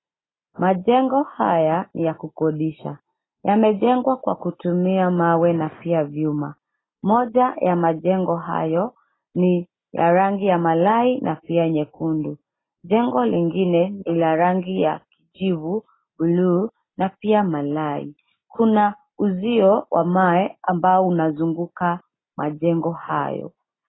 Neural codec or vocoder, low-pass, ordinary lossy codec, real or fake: none; 7.2 kHz; AAC, 16 kbps; real